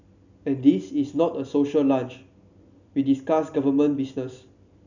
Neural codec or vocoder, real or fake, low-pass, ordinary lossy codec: none; real; 7.2 kHz; none